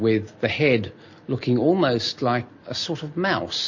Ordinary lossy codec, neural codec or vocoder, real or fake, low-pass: MP3, 32 kbps; none; real; 7.2 kHz